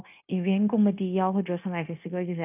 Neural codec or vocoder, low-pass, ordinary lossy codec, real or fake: codec, 16 kHz, 0.9 kbps, LongCat-Audio-Codec; 3.6 kHz; none; fake